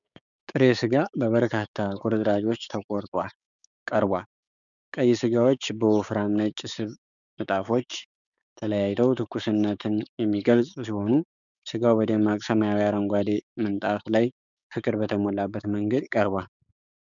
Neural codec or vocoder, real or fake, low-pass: codec, 16 kHz, 6 kbps, DAC; fake; 7.2 kHz